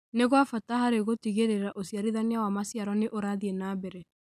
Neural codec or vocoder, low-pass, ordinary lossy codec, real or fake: none; 14.4 kHz; none; real